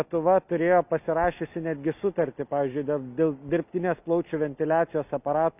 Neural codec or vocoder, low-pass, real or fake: none; 3.6 kHz; real